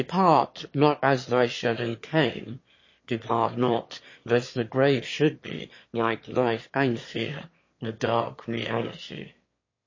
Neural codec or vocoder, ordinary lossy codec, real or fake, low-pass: autoencoder, 22.05 kHz, a latent of 192 numbers a frame, VITS, trained on one speaker; MP3, 32 kbps; fake; 7.2 kHz